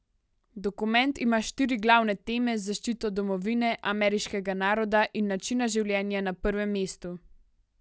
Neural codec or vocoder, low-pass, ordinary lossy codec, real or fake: none; none; none; real